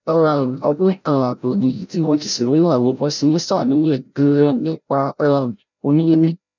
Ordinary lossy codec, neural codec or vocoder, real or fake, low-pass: none; codec, 16 kHz, 0.5 kbps, FreqCodec, larger model; fake; 7.2 kHz